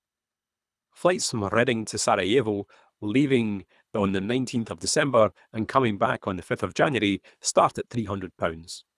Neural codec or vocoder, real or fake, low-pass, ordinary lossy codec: codec, 24 kHz, 3 kbps, HILCodec; fake; none; none